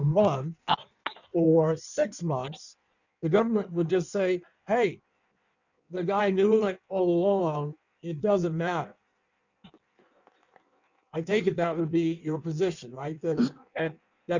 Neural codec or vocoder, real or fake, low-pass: codec, 16 kHz in and 24 kHz out, 1.1 kbps, FireRedTTS-2 codec; fake; 7.2 kHz